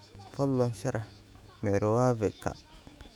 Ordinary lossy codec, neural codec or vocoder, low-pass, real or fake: none; autoencoder, 48 kHz, 128 numbers a frame, DAC-VAE, trained on Japanese speech; 19.8 kHz; fake